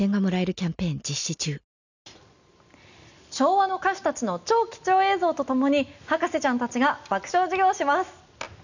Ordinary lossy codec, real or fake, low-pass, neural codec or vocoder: none; real; 7.2 kHz; none